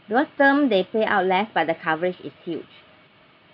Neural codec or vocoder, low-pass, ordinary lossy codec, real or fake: none; 5.4 kHz; none; real